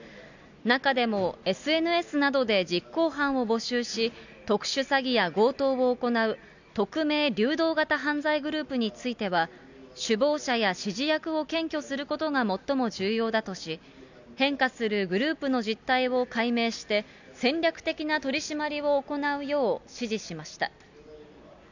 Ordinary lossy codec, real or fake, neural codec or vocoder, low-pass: none; real; none; 7.2 kHz